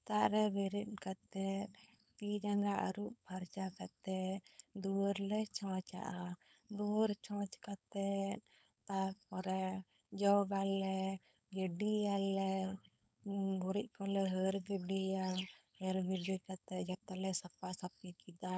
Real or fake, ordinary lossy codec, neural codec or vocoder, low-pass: fake; none; codec, 16 kHz, 4.8 kbps, FACodec; none